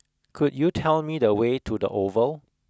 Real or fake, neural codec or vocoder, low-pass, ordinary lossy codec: real; none; none; none